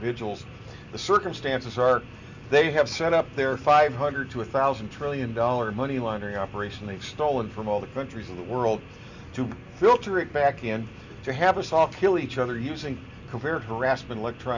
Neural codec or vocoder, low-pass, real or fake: none; 7.2 kHz; real